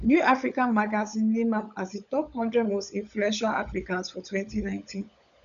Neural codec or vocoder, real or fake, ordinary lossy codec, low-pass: codec, 16 kHz, 16 kbps, FunCodec, trained on LibriTTS, 50 frames a second; fake; none; 7.2 kHz